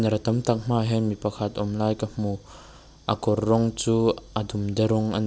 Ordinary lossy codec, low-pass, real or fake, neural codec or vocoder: none; none; real; none